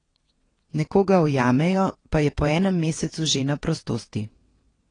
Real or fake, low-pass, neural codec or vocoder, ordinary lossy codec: fake; 9.9 kHz; vocoder, 22.05 kHz, 80 mel bands, WaveNeXt; AAC, 32 kbps